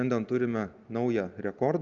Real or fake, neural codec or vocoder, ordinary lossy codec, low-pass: real; none; Opus, 24 kbps; 7.2 kHz